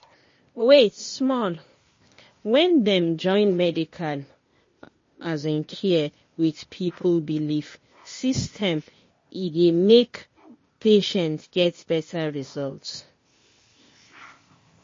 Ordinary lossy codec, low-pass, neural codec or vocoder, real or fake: MP3, 32 kbps; 7.2 kHz; codec, 16 kHz, 0.8 kbps, ZipCodec; fake